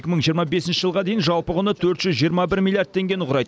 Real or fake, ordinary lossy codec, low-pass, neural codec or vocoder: real; none; none; none